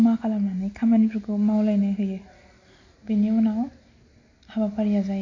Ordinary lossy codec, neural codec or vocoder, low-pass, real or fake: AAC, 48 kbps; none; 7.2 kHz; real